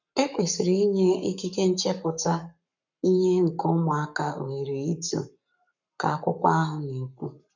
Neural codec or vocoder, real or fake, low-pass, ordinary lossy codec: codec, 44.1 kHz, 7.8 kbps, Pupu-Codec; fake; 7.2 kHz; none